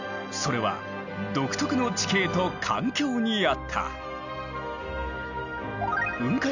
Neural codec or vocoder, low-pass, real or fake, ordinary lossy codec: none; 7.2 kHz; real; none